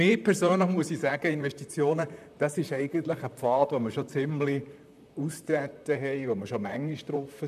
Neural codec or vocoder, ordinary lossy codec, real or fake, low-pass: vocoder, 44.1 kHz, 128 mel bands, Pupu-Vocoder; none; fake; 14.4 kHz